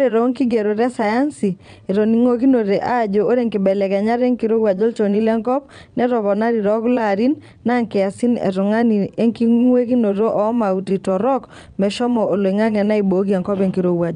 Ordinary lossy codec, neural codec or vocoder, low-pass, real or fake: none; vocoder, 22.05 kHz, 80 mel bands, Vocos; 9.9 kHz; fake